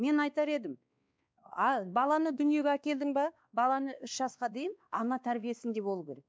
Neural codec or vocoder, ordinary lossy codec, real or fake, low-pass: codec, 16 kHz, 2 kbps, X-Codec, WavLM features, trained on Multilingual LibriSpeech; none; fake; none